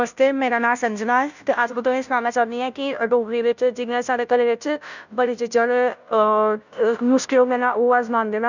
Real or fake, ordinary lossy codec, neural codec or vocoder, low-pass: fake; none; codec, 16 kHz, 0.5 kbps, FunCodec, trained on Chinese and English, 25 frames a second; 7.2 kHz